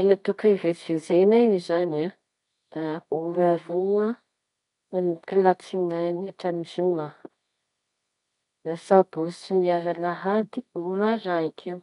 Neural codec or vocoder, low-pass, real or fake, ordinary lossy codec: codec, 24 kHz, 0.9 kbps, WavTokenizer, medium music audio release; 10.8 kHz; fake; none